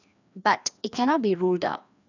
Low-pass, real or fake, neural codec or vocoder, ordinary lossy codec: 7.2 kHz; fake; codec, 16 kHz, 2 kbps, FreqCodec, larger model; none